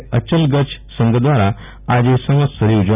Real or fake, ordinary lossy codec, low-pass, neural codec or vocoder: real; none; 3.6 kHz; none